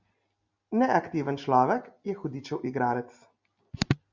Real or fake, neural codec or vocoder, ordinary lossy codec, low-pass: real; none; Opus, 64 kbps; 7.2 kHz